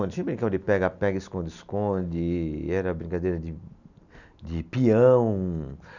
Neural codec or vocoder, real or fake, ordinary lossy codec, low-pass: none; real; none; 7.2 kHz